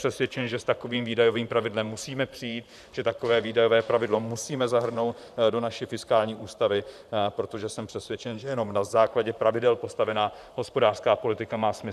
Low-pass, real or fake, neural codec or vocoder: 14.4 kHz; fake; vocoder, 44.1 kHz, 128 mel bands, Pupu-Vocoder